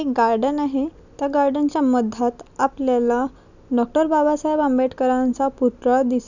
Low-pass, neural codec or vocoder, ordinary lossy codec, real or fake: 7.2 kHz; none; none; real